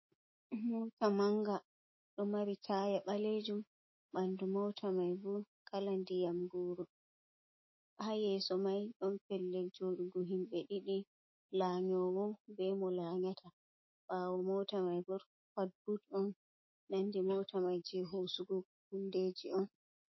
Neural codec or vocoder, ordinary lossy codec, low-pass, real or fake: autoencoder, 48 kHz, 128 numbers a frame, DAC-VAE, trained on Japanese speech; MP3, 24 kbps; 7.2 kHz; fake